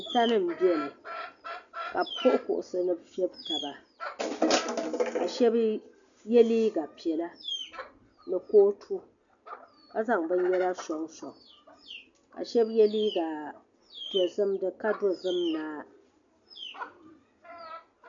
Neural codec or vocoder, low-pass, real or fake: none; 7.2 kHz; real